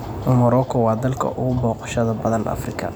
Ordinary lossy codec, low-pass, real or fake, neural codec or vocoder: none; none; real; none